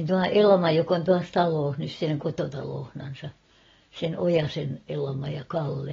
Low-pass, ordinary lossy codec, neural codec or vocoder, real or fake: 7.2 kHz; AAC, 24 kbps; none; real